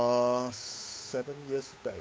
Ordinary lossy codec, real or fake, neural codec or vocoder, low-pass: none; fake; codec, 16 kHz, 8 kbps, FunCodec, trained on Chinese and English, 25 frames a second; none